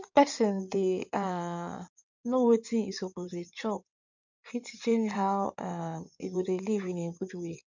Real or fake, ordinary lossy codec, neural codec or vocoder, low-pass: fake; none; codec, 16 kHz in and 24 kHz out, 2.2 kbps, FireRedTTS-2 codec; 7.2 kHz